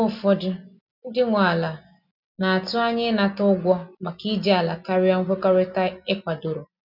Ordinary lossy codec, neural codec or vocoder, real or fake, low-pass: none; none; real; 5.4 kHz